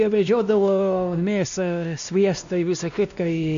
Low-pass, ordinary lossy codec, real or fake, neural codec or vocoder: 7.2 kHz; MP3, 64 kbps; fake; codec, 16 kHz, 1 kbps, X-Codec, WavLM features, trained on Multilingual LibriSpeech